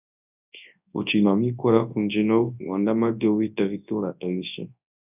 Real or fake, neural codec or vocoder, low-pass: fake; codec, 24 kHz, 0.9 kbps, WavTokenizer, large speech release; 3.6 kHz